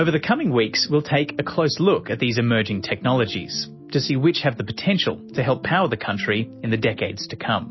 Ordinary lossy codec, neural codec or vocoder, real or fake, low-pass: MP3, 24 kbps; none; real; 7.2 kHz